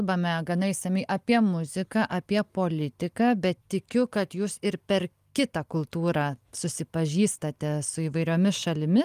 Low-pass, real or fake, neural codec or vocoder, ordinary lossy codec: 14.4 kHz; real; none; Opus, 32 kbps